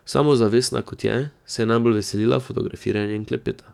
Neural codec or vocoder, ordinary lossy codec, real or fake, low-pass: codec, 44.1 kHz, 7.8 kbps, DAC; none; fake; 19.8 kHz